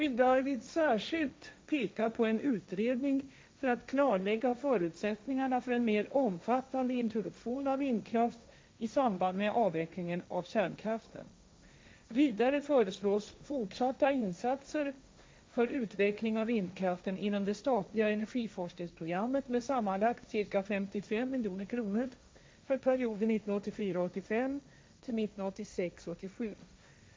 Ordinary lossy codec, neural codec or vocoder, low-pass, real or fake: none; codec, 16 kHz, 1.1 kbps, Voila-Tokenizer; none; fake